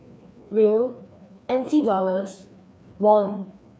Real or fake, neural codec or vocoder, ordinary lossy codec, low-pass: fake; codec, 16 kHz, 2 kbps, FreqCodec, larger model; none; none